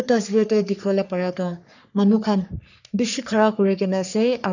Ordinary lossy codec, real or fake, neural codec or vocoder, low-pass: none; fake; codec, 44.1 kHz, 3.4 kbps, Pupu-Codec; 7.2 kHz